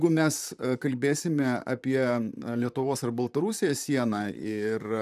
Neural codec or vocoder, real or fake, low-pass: vocoder, 44.1 kHz, 128 mel bands, Pupu-Vocoder; fake; 14.4 kHz